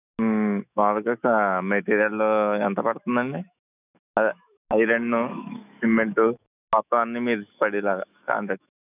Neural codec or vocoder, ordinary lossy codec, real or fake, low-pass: autoencoder, 48 kHz, 128 numbers a frame, DAC-VAE, trained on Japanese speech; none; fake; 3.6 kHz